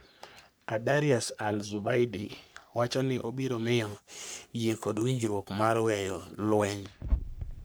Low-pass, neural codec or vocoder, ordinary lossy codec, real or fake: none; codec, 44.1 kHz, 3.4 kbps, Pupu-Codec; none; fake